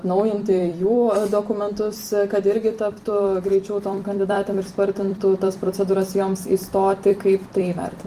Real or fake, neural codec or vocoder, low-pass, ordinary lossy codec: fake; vocoder, 44.1 kHz, 128 mel bands every 256 samples, BigVGAN v2; 14.4 kHz; Opus, 32 kbps